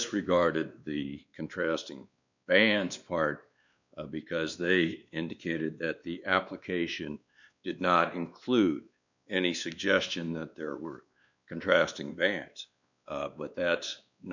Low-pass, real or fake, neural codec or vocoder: 7.2 kHz; fake; codec, 16 kHz, 2 kbps, X-Codec, WavLM features, trained on Multilingual LibriSpeech